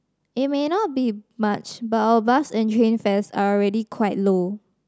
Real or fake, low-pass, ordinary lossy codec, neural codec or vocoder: real; none; none; none